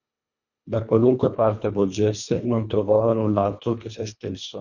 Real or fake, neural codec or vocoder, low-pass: fake; codec, 24 kHz, 1.5 kbps, HILCodec; 7.2 kHz